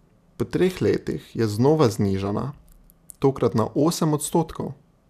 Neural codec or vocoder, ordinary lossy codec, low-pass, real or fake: none; none; 14.4 kHz; real